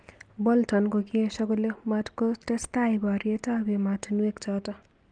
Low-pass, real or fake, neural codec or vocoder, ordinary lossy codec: 9.9 kHz; real; none; Opus, 32 kbps